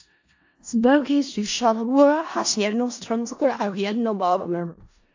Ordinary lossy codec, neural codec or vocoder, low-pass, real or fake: AAC, 48 kbps; codec, 16 kHz in and 24 kHz out, 0.4 kbps, LongCat-Audio-Codec, four codebook decoder; 7.2 kHz; fake